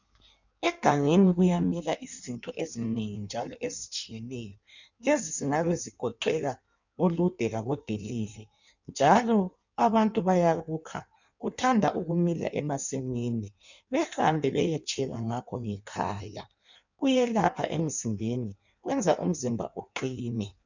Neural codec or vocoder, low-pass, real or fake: codec, 16 kHz in and 24 kHz out, 1.1 kbps, FireRedTTS-2 codec; 7.2 kHz; fake